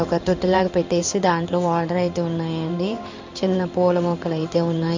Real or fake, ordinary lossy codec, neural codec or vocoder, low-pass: fake; MP3, 64 kbps; codec, 16 kHz in and 24 kHz out, 1 kbps, XY-Tokenizer; 7.2 kHz